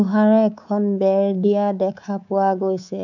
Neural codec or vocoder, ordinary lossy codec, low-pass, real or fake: codec, 24 kHz, 3.1 kbps, DualCodec; none; 7.2 kHz; fake